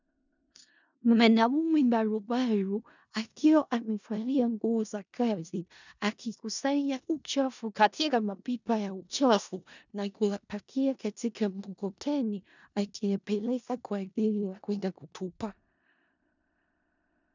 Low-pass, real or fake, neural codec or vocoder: 7.2 kHz; fake; codec, 16 kHz in and 24 kHz out, 0.4 kbps, LongCat-Audio-Codec, four codebook decoder